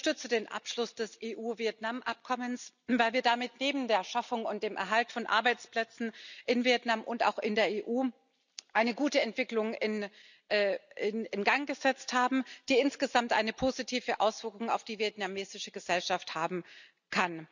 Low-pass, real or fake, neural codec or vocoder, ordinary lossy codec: 7.2 kHz; real; none; none